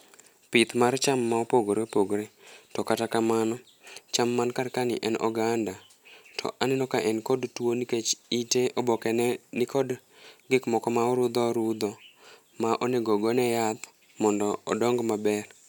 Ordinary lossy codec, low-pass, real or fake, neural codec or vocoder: none; none; real; none